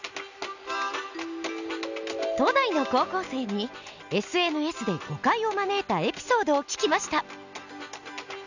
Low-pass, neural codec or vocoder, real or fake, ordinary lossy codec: 7.2 kHz; none; real; none